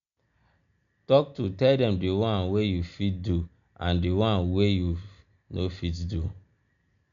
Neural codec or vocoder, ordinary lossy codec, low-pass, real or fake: none; none; 7.2 kHz; real